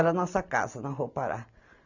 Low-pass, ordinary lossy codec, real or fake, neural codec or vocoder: 7.2 kHz; none; real; none